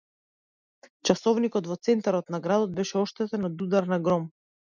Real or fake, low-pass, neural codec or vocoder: real; 7.2 kHz; none